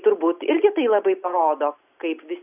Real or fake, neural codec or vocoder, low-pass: real; none; 3.6 kHz